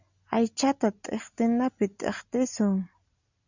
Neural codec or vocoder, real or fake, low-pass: none; real; 7.2 kHz